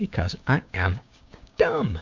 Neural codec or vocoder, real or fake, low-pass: codec, 16 kHz, 6 kbps, DAC; fake; 7.2 kHz